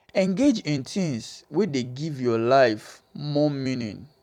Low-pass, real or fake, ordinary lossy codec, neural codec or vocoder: 19.8 kHz; fake; none; vocoder, 44.1 kHz, 128 mel bands every 256 samples, BigVGAN v2